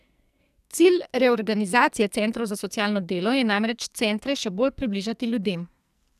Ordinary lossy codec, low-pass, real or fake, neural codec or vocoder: none; 14.4 kHz; fake; codec, 44.1 kHz, 2.6 kbps, SNAC